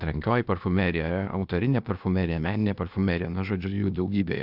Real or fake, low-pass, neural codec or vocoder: fake; 5.4 kHz; codec, 16 kHz, 0.8 kbps, ZipCodec